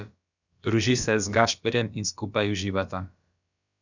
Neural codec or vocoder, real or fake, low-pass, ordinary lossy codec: codec, 16 kHz, about 1 kbps, DyCAST, with the encoder's durations; fake; 7.2 kHz; none